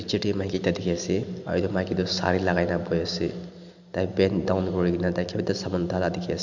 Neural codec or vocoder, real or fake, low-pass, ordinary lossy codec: none; real; 7.2 kHz; none